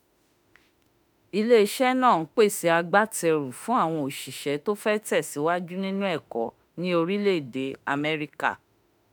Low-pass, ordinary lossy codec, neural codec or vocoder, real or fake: none; none; autoencoder, 48 kHz, 32 numbers a frame, DAC-VAE, trained on Japanese speech; fake